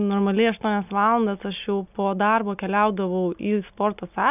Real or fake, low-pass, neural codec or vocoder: real; 3.6 kHz; none